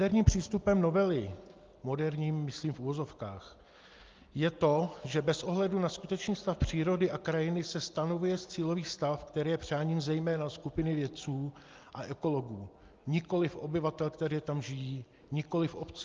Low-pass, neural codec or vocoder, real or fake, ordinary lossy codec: 7.2 kHz; none; real; Opus, 32 kbps